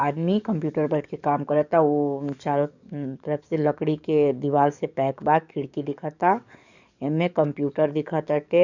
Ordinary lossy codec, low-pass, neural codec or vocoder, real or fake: none; 7.2 kHz; codec, 44.1 kHz, 7.8 kbps, DAC; fake